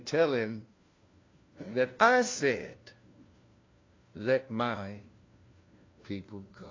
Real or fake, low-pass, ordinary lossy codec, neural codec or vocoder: fake; 7.2 kHz; AAC, 32 kbps; codec, 16 kHz, 1 kbps, FunCodec, trained on LibriTTS, 50 frames a second